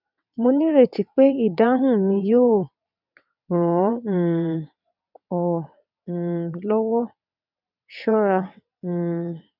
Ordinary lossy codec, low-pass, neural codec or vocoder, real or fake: none; 5.4 kHz; vocoder, 22.05 kHz, 80 mel bands, WaveNeXt; fake